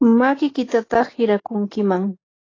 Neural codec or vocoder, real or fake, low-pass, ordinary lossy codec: none; real; 7.2 kHz; AAC, 32 kbps